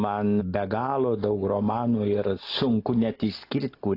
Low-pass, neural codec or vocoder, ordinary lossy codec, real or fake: 5.4 kHz; none; AAC, 32 kbps; real